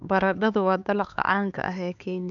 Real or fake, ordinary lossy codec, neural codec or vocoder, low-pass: fake; none; codec, 16 kHz, 4 kbps, X-Codec, HuBERT features, trained on LibriSpeech; 7.2 kHz